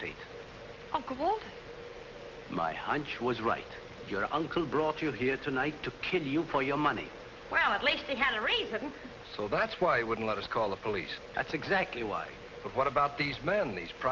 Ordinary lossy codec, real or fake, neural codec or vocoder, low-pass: Opus, 24 kbps; real; none; 7.2 kHz